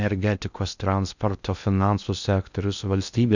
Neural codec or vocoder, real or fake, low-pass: codec, 16 kHz in and 24 kHz out, 0.6 kbps, FocalCodec, streaming, 2048 codes; fake; 7.2 kHz